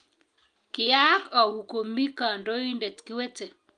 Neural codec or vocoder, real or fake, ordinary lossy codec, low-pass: none; real; Opus, 32 kbps; 9.9 kHz